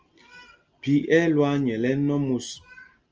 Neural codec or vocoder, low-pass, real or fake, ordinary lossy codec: none; 7.2 kHz; real; Opus, 24 kbps